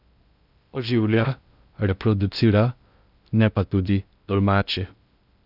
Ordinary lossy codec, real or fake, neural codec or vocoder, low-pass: none; fake; codec, 16 kHz in and 24 kHz out, 0.6 kbps, FocalCodec, streaming, 2048 codes; 5.4 kHz